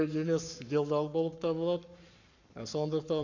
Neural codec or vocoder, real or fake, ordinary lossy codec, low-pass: codec, 44.1 kHz, 7.8 kbps, Pupu-Codec; fake; none; 7.2 kHz